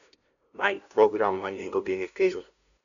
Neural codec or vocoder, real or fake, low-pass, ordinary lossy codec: codec, 16 kHz, 0.5 kbps, FunCodec, trained on LibriTTS, 25 frames a second; fake; 7.2 kHz; none